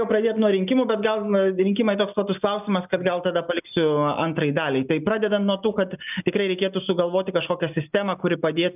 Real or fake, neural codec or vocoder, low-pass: real; none; 3.6 kHz